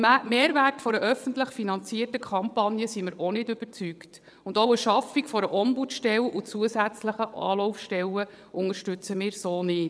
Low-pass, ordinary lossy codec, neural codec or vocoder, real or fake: none; none; vocoder, 22.05 kHz, 80 mel bands, WaveNeXt; fake